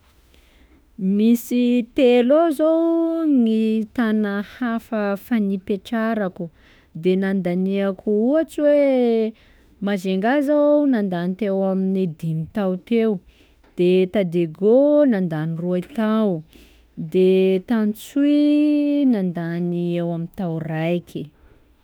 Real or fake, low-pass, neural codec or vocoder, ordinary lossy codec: fake; none; autoencoder, 48 kHz, 32 numbers a frame, DAC-VAE, trained on Japanese speech; none